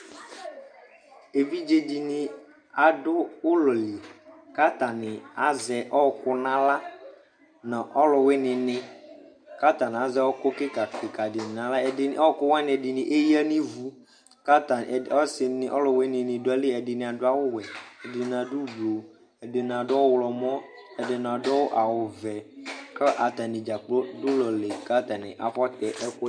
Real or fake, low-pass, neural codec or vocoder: real; 9.9 kHz; none